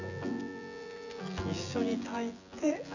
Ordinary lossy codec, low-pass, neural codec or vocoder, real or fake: none; 7.2 kHz; vocoder, 24 kHz, 100 mel bands, Vocos; fake